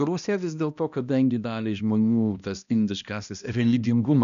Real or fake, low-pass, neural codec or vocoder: fake; 7.2 kHz; codec, 16 kHz, 1 kbps, X-Codec, HuBERT features, trained on balanced general audio